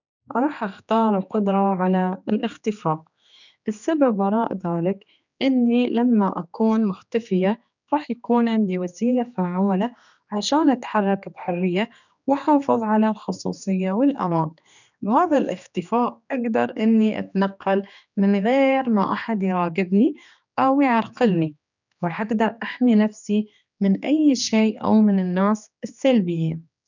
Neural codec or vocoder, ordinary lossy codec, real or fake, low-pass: codec, 16 kHz, 2 kbps, X-Codec, HuBERT features, trained on general audio; none; fake; 7.2 kHz